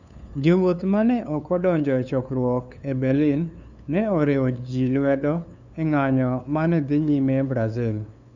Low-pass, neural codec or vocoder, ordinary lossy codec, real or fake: 7.2 kHz; codec, 16 kHz, 2 kbps, FunCodec, trained on LibriTTS, 25 frames a second; none; fake